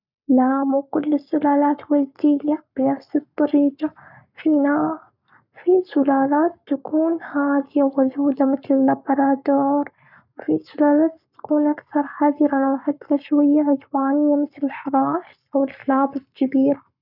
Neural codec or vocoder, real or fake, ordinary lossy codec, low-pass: codec, 44.1 kHz, 7.8 kbps, Pupu-Codec; fake; none; 5.4 kHz